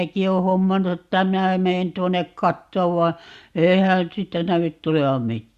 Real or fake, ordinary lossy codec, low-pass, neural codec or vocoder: real; Opus, 64 kbps; 14.4 kHz; none